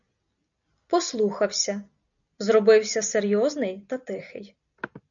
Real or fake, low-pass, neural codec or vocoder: real; 7.2 kHz; none